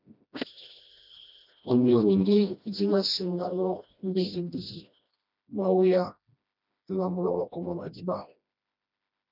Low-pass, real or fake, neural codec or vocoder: 5.4 kHz; fake; codec, 16 kHz, 1 kbps, FreqCodec, smaller model